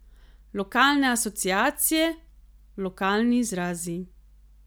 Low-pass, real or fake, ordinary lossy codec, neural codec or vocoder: none; real; none; none